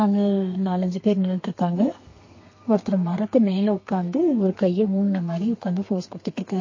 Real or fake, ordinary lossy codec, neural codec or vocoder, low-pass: fake; MP3, 32 kbps; codec, 32 kHz, 1.9 kbps, SNAC; 7.2 kHz